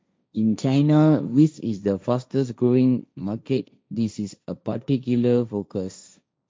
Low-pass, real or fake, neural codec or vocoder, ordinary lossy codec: none; fake; codec, 16 kHz, 1.1 kbps, Voila-Tokenizer; none